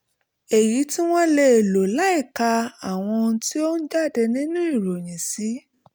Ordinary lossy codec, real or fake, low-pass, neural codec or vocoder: none; real; none; none